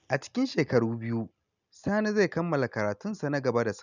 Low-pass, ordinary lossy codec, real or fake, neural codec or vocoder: 7.2 kHz; none; real; none